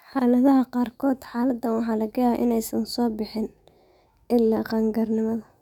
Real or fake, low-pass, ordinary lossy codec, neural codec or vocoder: fake; 19.8 kHz; none; autoencoder, 48 kHz, 128 numbers a frame, DAC-VAE, trained on Japanese speech